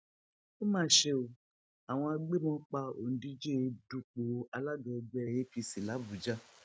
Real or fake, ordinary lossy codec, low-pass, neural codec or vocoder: real; none; none; none